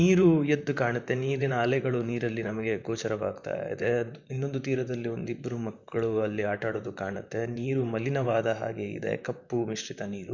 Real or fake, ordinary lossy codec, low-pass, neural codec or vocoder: fake; none; 7.2 kHz; vocoder, 44.1 kHz, 128 mel bands, Pupu-Vocoder